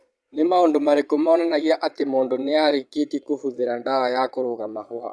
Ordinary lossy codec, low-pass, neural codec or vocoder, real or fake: none; none; vocoder, 22.05 kHz, 80 mel bands, Vocos; fake